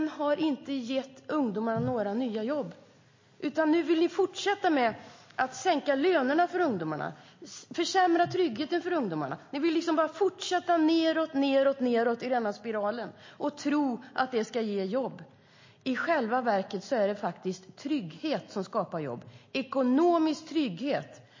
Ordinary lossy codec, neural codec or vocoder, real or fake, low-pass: MP3, 32 kbps; none; real; 7.2 kHz